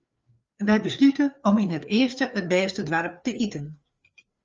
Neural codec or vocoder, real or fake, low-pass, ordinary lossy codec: codec, 16 kHz, 4 kbps, FreqCodec, larger model; fake; 7.2 kHz; Opus, 32 kbps